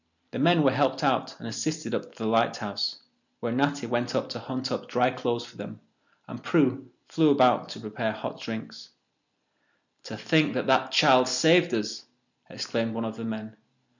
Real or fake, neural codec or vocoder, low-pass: real; none; 7.2 kHz